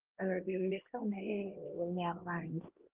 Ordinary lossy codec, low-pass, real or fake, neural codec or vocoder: Opus, 16 kbps; 3.6 kHz; fake; codec, 16 kHz, 1 kbps, X-Codec, HuBERT features, trained on LibriSpeech